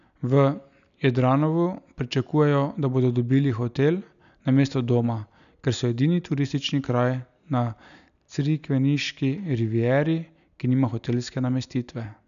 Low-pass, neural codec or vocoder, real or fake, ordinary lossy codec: 7.2 kHz; none; real; none